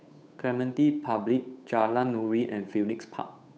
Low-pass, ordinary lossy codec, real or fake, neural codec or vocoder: none; none; fake; codec, 16 kHz, 8 kbps, FunCodec, trained on Chinese and English, 25 frames a second